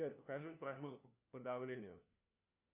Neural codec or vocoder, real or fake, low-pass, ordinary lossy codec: codec, 16 kHz, 1 kbps, FunCodec, trained on LibriTTS, 50 frames a second; fake; 3.6 kHz; Opus, 64 kbps